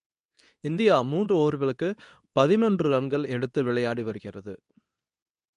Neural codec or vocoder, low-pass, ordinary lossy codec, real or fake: codec, 24 kHz, 0.9 kbps, WavTokenizer, medium speech release version 2; 10.8 kHz; none; fake